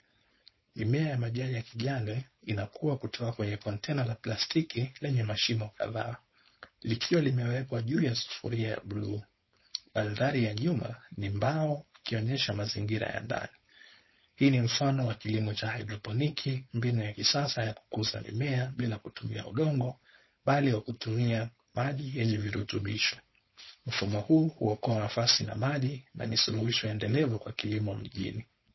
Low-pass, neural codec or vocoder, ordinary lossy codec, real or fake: 7.2 kHz; codec, 16 kHz, 4.8 kbps, FACodec; MP3, 24 kbps; fake